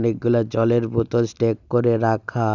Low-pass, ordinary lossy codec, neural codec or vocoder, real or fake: 7.2 kHz; none; none; real